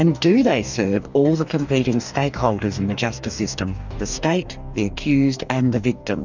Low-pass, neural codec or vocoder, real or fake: 7.2 kHz; codec, 44.1 kHz, 2.6 kbps, DAC; fake